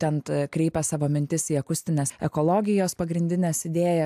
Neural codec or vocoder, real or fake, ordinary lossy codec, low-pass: none; real; AAC, 96 kbps; 14.4 kHz